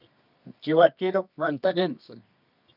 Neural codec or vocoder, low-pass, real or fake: codec, 24 kHz, 0.9 kbps, WavTokenizer, medium music audio release; 5.4 kHz; fake